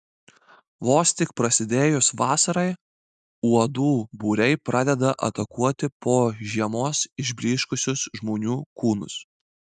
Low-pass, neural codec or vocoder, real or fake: 10.8 kHz; none; real